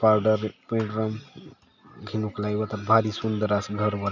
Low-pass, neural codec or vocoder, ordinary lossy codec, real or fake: 7.2 kHz; none; none; real